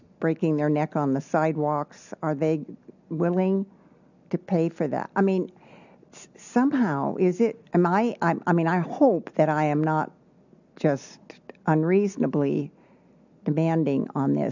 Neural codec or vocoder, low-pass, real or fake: none; 7.2 kHz; real